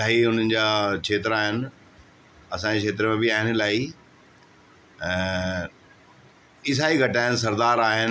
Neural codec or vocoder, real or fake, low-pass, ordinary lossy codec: none; real; none; none